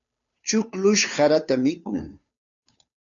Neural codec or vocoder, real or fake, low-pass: codec, 16 kHz, 2 kbps, FunCodec, trained on Chinese and English, 25 frames a second; fake; 7.2 kHz